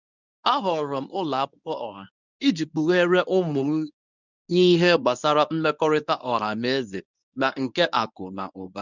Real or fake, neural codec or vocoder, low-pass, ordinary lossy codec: fake; codec, 24 kHz, 0.9 kbps, WavTokenizer, medium speech release version 1; 7.2 kHz; none